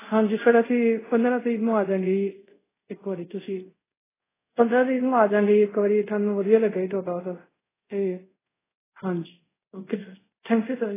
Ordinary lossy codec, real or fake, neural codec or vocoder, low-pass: AAC, 16 kbps; fake; codec, 24 kHz, 0.5 kbps, DualCodec; 3.6 kHz